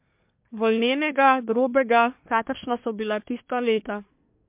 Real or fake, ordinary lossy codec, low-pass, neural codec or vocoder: fake; MP3, 32 kbps; 3.6 kHz; codec, 24 kHz, 1 kbps, SNAC